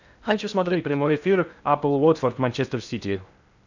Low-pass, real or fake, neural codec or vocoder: 7.2 kHz; fake; codec, 16 kHz in and 24 kHz out, 0.6 kbps, FocalCodec, streaming, 2048 codes